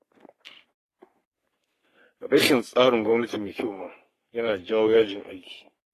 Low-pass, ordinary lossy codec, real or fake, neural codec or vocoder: 14.4 kHz; AAC, 48 kbps; fake; codec, 44.1 kHz, 3.4 kbps, Pupu-Codec